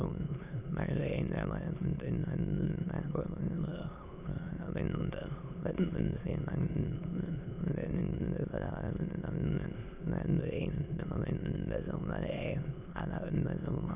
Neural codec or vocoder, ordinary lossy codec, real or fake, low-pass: autoencoder, 22.05 kHz, a latent of 192 numbers a frame, VITS, trained on many speakers; none; fake; 3.6 kHz